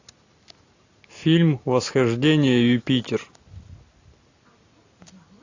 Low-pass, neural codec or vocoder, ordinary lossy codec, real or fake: 7.2 kHz; none; AAC, 48 kbps; real